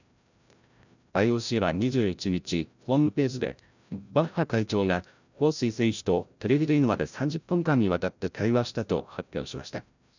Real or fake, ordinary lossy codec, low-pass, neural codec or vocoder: fake; none; 7.2 kHz; codec, 16 kHz, 0.5 kbps, FreqCodec, larger model